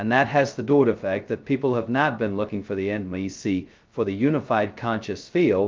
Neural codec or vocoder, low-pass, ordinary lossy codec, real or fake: codec, 16 kHz, 0.2 kbps, FocalCodec; 7.2 kHz; Opus, 24 kbps; fake